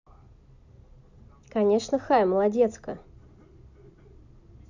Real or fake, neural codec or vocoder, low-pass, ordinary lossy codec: real; none; 7.2 kHz; none